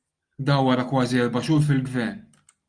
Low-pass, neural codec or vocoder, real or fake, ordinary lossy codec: 9.9 kHz; none; real; Opus, 32 kbps